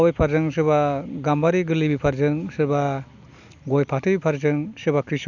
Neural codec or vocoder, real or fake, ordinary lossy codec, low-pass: none; real; none; 7.2 kHz